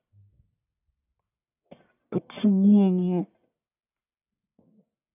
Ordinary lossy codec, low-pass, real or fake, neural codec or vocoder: none; 3.6 kHz; fake; codec, 44.1 kHz, 1.7 kbps, Pupu-Codec